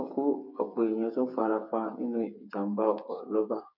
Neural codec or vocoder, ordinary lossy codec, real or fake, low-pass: codec, 16 kHz, 8 kbps, FreqCodec, smaller model; none; fake; 5.4 kHz